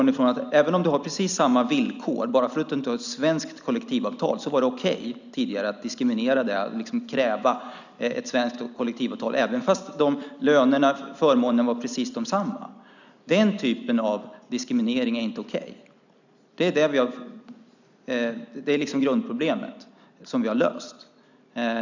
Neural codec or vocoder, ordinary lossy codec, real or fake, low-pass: none; none; real; 7.2 kHz